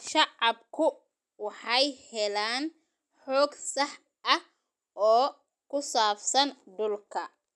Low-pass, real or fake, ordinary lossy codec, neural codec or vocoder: none; real; none; none